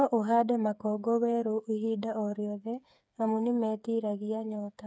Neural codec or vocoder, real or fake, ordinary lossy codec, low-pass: codec, 16 kHz, 8 kbps, FreqCodec, smaller model; fake; none; none